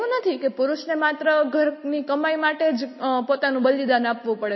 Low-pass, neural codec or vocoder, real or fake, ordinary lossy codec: 7.2 kHz; none; real; MP3, 24 kbps